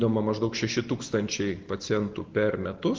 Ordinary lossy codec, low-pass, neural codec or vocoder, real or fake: Opus, 16 kbps; 7.2 kHz; none; real